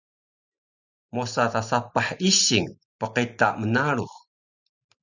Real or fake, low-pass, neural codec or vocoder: fake; 7.2 kHz; vocoder, 44.1 kHz, 128 mel bands every 512 samples, BigVGAN v2